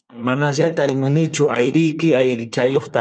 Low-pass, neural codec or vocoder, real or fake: 9.9 kHz; codec, 24 kHz, 1 kbps, SNAC; fake